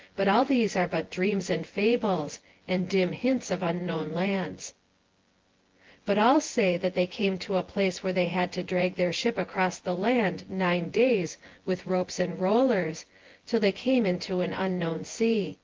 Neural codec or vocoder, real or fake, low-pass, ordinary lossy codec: vocoder, 24 kHz, 100 mel bands, Vocos; fake; 7.2 kHz; Opus, 16 kbps